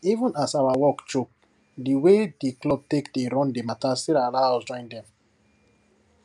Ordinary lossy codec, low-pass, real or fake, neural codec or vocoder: none; 10.8 kHz; real; none